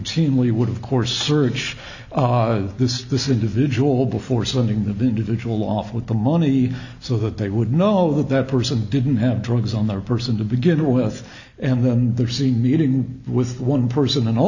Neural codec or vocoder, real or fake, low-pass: none; real; 7.2 kHz